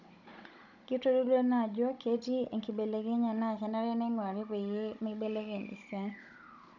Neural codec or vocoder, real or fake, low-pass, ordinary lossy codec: codec, 16 kHz, 16 kbps, FunCodec, trained on Chinese and English, 50 frames a second; fake; 7.2 kHz; none